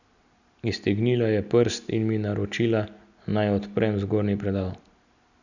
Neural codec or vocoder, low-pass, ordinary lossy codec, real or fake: none; 7.2 kHz; none; real